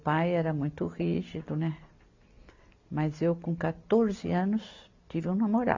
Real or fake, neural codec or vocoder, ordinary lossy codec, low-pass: real; none; AAC, 48 kbps; 7.2 kHz